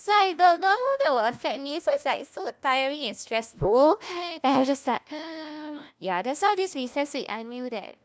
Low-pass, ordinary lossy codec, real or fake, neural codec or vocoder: none; none; fake; codec, 16 kHz, 1 kbps, FunCodec, trained on LibriTTS, 50 frames a second